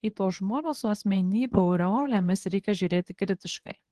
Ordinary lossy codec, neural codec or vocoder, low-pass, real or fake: Opus, 16 kbps; codec, 24 kHz, 0.9 kbps, WavTokenizer, medium speech release version 1; 10.8 kHz; fake